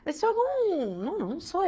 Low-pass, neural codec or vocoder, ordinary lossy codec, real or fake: none; codec, 16 kHz, 8 kbps, FreqCodec, smaller model; none; fake